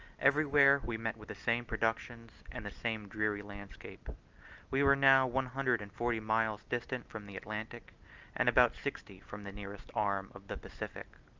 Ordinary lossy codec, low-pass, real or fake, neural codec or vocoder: Opus, 24 kbps; 7.2 kHz; real; none